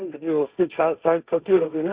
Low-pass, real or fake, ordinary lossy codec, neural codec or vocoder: 3.6 kHz; fake; Opus, 64 kbps; codec, 16 kHz, 1.1 kbps, Voila-Tokenizer